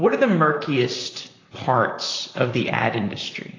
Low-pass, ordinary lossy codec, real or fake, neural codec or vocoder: 7.2 kHz; AAC, 32 kbps; fake; vocoder, 44.1 kHz, 128 mel bands, Pupu-Vocoder